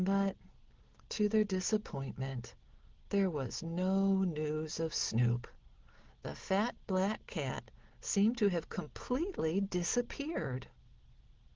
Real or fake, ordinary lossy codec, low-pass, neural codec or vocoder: real; Opus, 16 kbps; 7.2 kHz; none